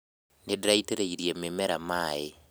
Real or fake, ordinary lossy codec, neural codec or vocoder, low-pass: real; none; none; none